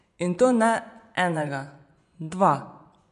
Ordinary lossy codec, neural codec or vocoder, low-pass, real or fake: none; vocoder, 24 kHz, 100 mel bands, Vocos; 10.8 kHz; fake